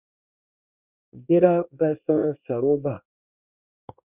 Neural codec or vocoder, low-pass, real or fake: codec, 24 kHz, 1 kbps, SNAC; 3.6 kHz; fake